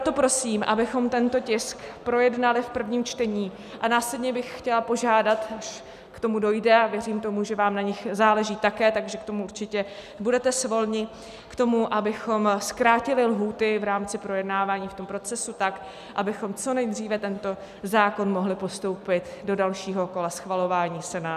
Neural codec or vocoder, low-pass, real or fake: none; 14.4 kHz; real